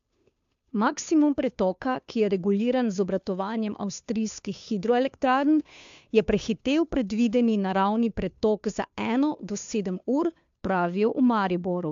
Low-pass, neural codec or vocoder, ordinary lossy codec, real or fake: 7.2 kHz; codec, 16 kHz, 2 kbps, FunCodec, trained on Chinese and English, 25 frames a second; AAC, 64 kbps; fake